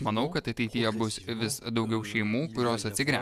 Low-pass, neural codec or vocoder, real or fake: 14.4 kHz; vocoder, 48 kHz, 128 mel bands, Vocos; fake